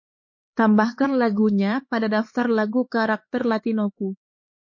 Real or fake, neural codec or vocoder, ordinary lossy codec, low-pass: fake; codec, 16 kHz, 4 kbps, X-Codec, HuBERT features, trained on LibriSpeech; MP3, 32 kbps; 7.2 kHz